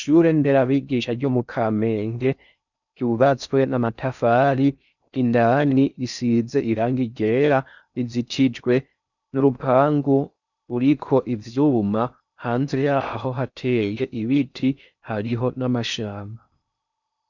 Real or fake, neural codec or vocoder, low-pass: fake; codec, 16 kHz in and 24 kHz out, 0.6 kbps, FocalCodec, streaming, 4096 codes; 7.2 kHz